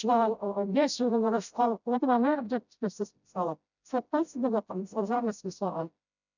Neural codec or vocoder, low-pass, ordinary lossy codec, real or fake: codec, 16 kHz, 0.5 kbps, FreqCodec, smaller model; 7.2 kHz; none; fake